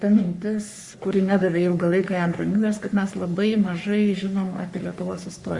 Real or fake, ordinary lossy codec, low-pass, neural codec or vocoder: fake; Opus, 64 kbps; 10.8 kHz; codec, 44.1 kHz, 3.4 kbps, Pupu-Codec